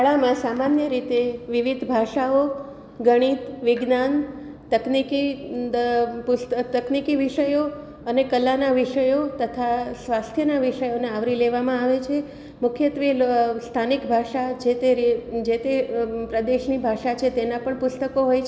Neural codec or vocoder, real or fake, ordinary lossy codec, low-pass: none; real; none; none